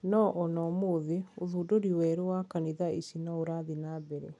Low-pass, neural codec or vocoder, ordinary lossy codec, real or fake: 10.8 kHz; none; none; real